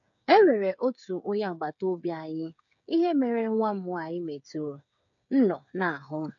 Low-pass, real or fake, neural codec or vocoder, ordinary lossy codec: 7.2 kHz; fake; codec, 16 kHz, 8 kbps, FreqCodec, smaller model; none